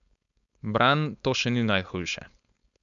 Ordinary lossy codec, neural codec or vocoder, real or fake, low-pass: none; codec, 16 kHz, 4.8 kbps, FACodec; fake; 7.2 kHz